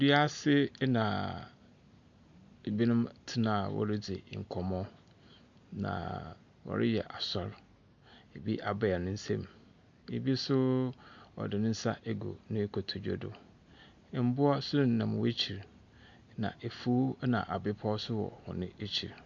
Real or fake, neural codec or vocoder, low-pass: real; none; 7.2 kHz